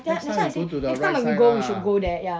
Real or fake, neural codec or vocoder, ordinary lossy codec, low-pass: real; none; none; none